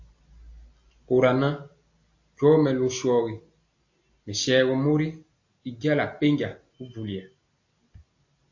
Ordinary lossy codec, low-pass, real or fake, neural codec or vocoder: AAC, 48 kbps; 7.2 kHz; real; none